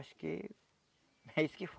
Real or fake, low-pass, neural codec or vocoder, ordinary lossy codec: real; none; none; none